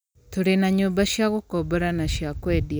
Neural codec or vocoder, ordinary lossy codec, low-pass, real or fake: none; none; none; real